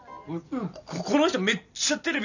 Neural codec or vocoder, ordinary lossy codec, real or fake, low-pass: none; none; real; 7.2 kHz